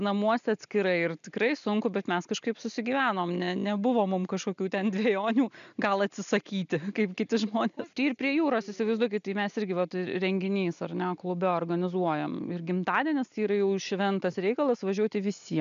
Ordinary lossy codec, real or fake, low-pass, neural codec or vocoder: MP3, 96 kbps; real; 7.2 kHz; none